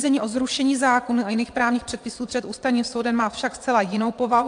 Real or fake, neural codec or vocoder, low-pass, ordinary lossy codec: fake; vocoder, 22.05 kHz, 80 mel bands, Vocos; 9.9 kHz; AAC, 64 kbps